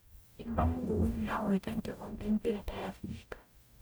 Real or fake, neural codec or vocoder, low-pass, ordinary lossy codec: fake; codec, 44.1 kHz, 0.9 kbps, DAC; none; none